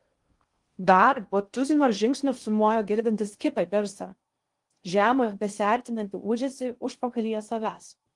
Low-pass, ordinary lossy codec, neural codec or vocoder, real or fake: 10.8 kHz; Opus, 24 kbps; codec, 16 kHz in and 24 kHz out, 0.6 kbps, FocalCodec, streaming, 2048 codes; fake